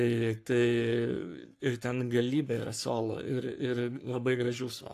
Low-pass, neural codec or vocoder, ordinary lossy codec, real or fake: 14.4 kHz; codec, 44.1 kHz, 3.4 kbps, Pupu-Codec; AAC, 64 kbps; fake